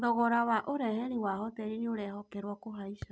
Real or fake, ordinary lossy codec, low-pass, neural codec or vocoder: real; none; none; none